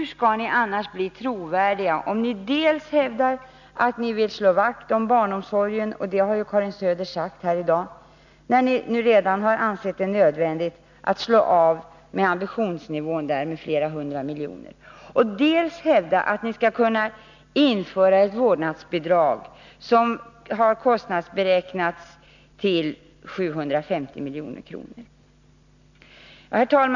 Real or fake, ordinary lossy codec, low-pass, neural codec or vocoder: real; none; 7.2 kHz; none